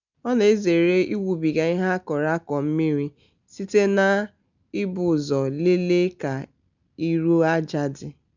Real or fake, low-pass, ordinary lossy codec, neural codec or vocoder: real; 7.2 kHz; none; none